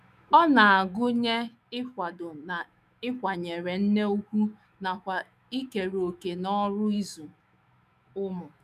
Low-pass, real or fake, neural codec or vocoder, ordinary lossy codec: 14.4 kHz; fake; autoencoder, 48 kHz, 128 numbers a frame, DAC-VAE, trained on Japanese speech; none